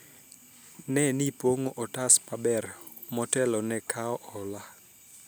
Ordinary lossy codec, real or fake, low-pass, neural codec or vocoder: none; real; none; none